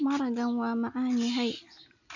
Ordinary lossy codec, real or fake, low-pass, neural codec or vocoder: none; real; 7.2 kHz; none